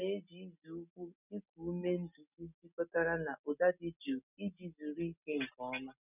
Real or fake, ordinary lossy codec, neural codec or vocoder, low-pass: real; none; none; 3.6 kHz